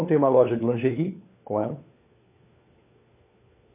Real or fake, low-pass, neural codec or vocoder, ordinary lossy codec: fake; 3.6 kHz; codec, 16 kHz, 4 kbps, FunCodec, trained on LibriTTS, 50 frames a second; MP3, 24 kbps